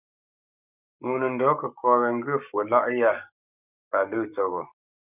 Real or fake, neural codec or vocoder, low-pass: fake; codec, 44.1 kHz, 7.8 kbps, Pupu-Codec; 3.6 kHz